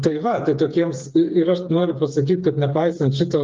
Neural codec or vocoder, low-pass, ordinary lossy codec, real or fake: codec, 16 kHz, 4 kbps, FreqCodec, smaller model; 7.2 kHz; Opus, 24 kbps; fake